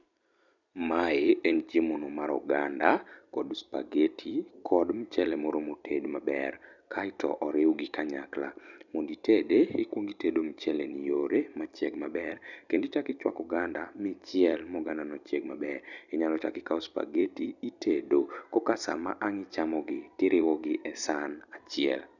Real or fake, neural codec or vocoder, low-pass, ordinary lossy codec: fake; vocoder, 44.1 kHz, 128 mel bands every 256 samples, BigVGAN v2; 7.2 kHz; none